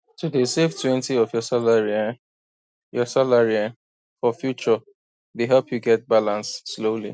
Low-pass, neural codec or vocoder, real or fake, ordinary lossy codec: none; none; real; none